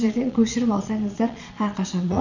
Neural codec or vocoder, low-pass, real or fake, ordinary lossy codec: vocoder, 22.05 kHz, 80 mel bands, Vocos; 7.2 kHz; fake; none